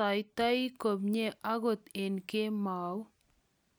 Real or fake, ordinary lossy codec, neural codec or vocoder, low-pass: real; none; none; none